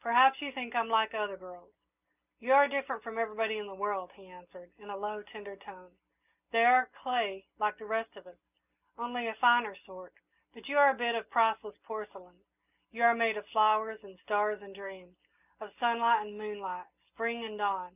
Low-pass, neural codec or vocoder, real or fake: 3.6 kHz; none; real